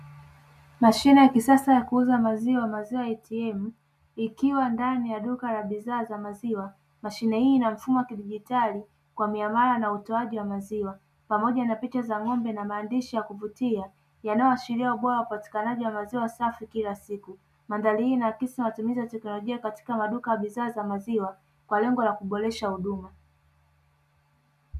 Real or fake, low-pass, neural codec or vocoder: real; 14.4 kHz; none